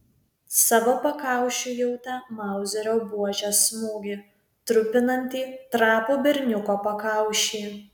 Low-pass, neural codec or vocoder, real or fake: 19.8 kHz; none; real